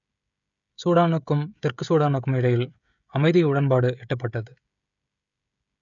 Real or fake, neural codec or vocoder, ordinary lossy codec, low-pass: fake; codec, 16 kHz, 16 kbps, FreqCodec, smaller model; none; 7.2 kHz